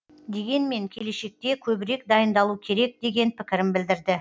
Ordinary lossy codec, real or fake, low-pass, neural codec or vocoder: none; real; none; none